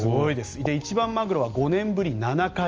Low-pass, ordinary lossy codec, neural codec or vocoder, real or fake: 7.2 kHz; Opus, 24 kbps; none; real